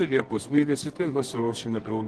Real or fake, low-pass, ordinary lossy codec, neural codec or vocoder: fake; 10.8 kHz; Opus, 16 kbps; codec, 24 kHz, 0.9 kbps, WavTokenizer, medium music audio release